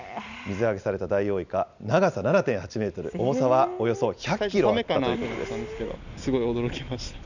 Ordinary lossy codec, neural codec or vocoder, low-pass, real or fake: none; none; 7.2 kHz; real